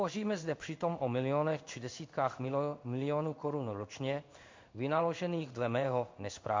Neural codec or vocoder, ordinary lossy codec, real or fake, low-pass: codec, 16 kHz in and 24 kHz out, 1 kbps, XY-Tokenizer; MP3, 48 kbps; fake; 7.2 kHz